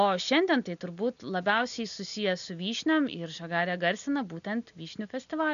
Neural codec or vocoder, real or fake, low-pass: none; real; 7.2 kHz